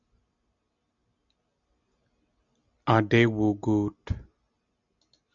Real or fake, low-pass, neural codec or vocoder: real; 7.2 kHz; none